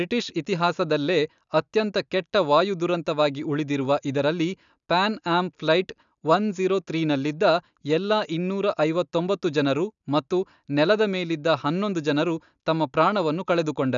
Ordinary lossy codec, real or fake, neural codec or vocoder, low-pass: none; real; none; 7.2 kHz